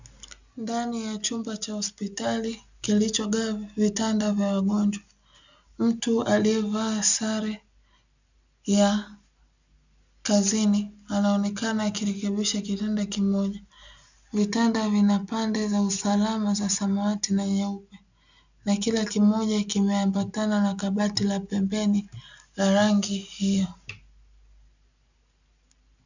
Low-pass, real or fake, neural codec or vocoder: 7.2 kHz; real; none